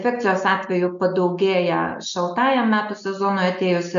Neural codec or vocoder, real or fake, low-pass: none; real; 7.2 kHz